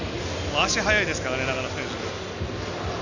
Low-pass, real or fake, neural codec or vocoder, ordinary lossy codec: 7.2 kHz; real; none; none